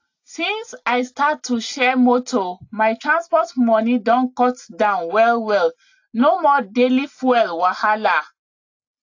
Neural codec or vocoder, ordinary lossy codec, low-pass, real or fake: none; AAC, 48 kbps; 7.2 kHz; real